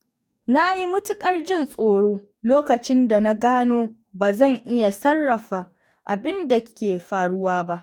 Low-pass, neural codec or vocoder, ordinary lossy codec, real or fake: 19.8 kHz; codec, 44.1 kHz, 2.6 kbps, DAC; none; fake